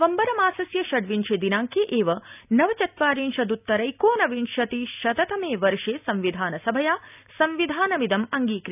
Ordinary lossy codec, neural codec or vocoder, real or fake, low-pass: none; none; real; 3.6 kHz